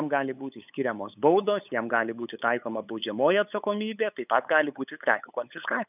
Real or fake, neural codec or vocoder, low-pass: fake; codec, 16 kHz, 8 kbps, FunCodec, trained on LibriTTS, 25 frames a second; 3.6 kHz